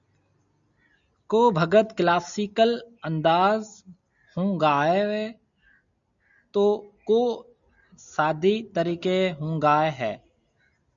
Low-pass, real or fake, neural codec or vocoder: 7.2 kHz; real; none